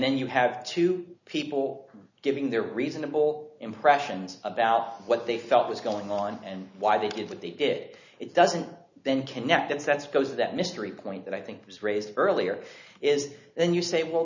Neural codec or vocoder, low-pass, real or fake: none; 7.2 kHz; real